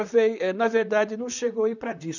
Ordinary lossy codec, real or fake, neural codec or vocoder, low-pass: none; fake; vocoder, 44.1 kHz, 128 mel bands, Pupu-Vocoder; 7.2 kHz